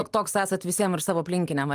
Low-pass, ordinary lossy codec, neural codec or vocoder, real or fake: 14.4 kHz; Opus, 32 kbps; none; real